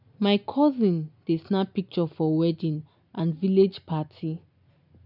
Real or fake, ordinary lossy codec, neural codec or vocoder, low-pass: real; none; none; 5.4 kHz